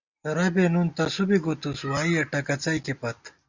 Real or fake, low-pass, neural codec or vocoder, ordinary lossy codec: real; 7.2 kHz; none; Opus, 64 kbps